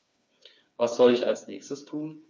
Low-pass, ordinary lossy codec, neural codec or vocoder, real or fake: none; none; codec, 16 kHz, 4 kbps, FreqCodec, smaller model; fake